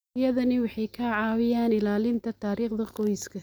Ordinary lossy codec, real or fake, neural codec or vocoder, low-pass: none; real; none; none